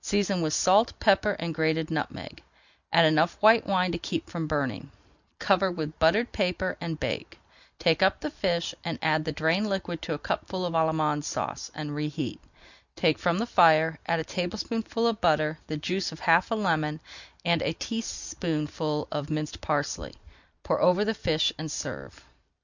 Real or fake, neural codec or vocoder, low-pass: real; none; 7.2 kHz